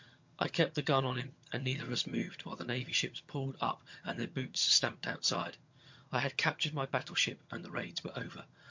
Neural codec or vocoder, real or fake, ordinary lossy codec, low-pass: vocoder, 22.05 kHz, 80 mel bands, HiFi-GAN; fake; MP3, 48 kbps; 7.2 kHz